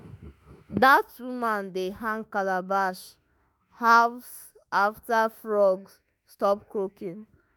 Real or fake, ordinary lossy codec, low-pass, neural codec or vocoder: fake; none; none; autoencoder, 48 kHz, 32 numbers a frame, DAC-VAE, trained on Japanese speech